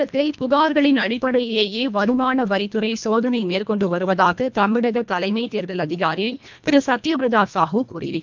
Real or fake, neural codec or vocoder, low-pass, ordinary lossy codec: fake; codec, 24 kHz, 1.5 kbps, HILCodec; 7.2 kHz; MP3, 64 kbps